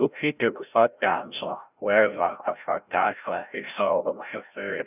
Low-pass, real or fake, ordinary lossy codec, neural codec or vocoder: 3.6 kHz; fake; none; codec, 16 kHz, 0.5 kbps, FreqCodec, larger model